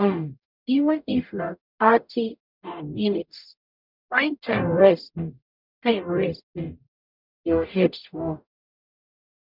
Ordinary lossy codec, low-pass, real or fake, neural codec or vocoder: none; 5.4 kHz; fake; codec, 44.1 kHz, 0.9 kbps, DAC